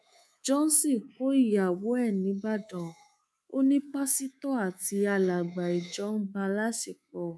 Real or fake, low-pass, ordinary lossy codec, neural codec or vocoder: fake; none; none; codec, 24 kHz, 3.1 kbps, DualCodec